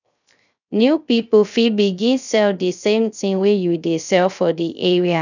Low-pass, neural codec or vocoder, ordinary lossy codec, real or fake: 7.2 kHz; codec, 16 kHz, 0.3 kbps, FocalCodec; none; fake